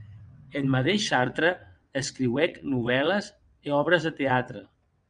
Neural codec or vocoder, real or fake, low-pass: vocoder, 22.05 kHz, 80 mel bands, WaveNeXt; fake; 9.9 kHz